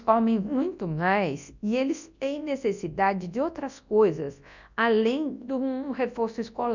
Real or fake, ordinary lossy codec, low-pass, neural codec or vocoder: fake; none; 7.2 kHz; codec, 24 kHz, 0.9 kbps, WavTokenizer, large speech release